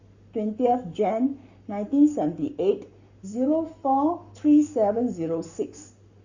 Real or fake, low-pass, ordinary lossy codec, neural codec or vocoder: fake; 7.2 kHz; Opus, 64 kbps; codec, 44.1 kHz, 7.8 kbps, Pupu-Codec